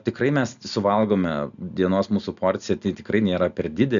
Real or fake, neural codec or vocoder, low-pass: real; none; 7.2 kHz